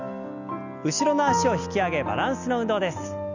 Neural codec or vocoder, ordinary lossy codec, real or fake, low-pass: none; none; real; 7.2 kHz